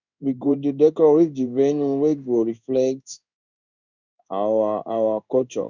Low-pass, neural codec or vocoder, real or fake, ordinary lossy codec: 7.2 kHz; codec, 16 kHz in and 24 kHz out, 1 kbps, XY-Tokenizer; fake; none